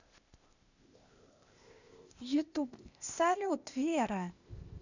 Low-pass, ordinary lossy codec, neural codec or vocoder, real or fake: 7.2 kHz; none; codec, 16 kHz, 0.8 kbps, ZipCodec; fake